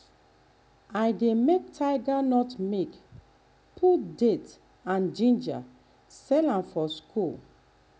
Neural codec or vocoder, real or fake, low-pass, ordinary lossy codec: none; real; none; none